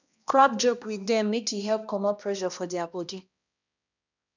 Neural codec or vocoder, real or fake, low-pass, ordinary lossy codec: codec, 16 kHz, 1 kbps, X-Codec, HuBERT features, trained on balanced general audio; fake; 7.2 kHz; none